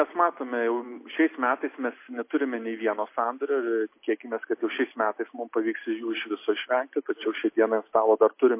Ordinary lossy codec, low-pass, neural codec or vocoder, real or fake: MP3, 24 kbps; 3.6 kHz; none; real